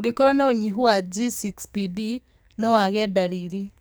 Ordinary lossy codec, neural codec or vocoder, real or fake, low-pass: none; codec, 44.1 kHz, 2.6 kbps, SNAC; fake; none